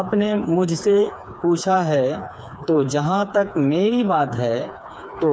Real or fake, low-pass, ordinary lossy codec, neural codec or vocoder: fake; none; none; codec, 16 kHz, 4 kbps, FreqCodec, smaller model